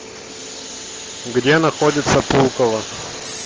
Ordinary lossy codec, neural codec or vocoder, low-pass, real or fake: Opus, 16 kbps; none; 7.2 kHz; real